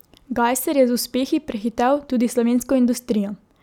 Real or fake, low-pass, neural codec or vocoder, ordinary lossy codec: real; 19.8 kHz; none; none